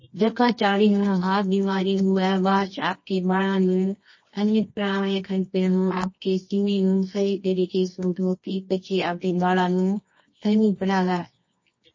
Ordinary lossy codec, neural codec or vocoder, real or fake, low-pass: MP3, 32 kbps; codec, 24 kHz, 0.9 kbps, WavTokenizer, medium music audio release; fake; 7.2 kHz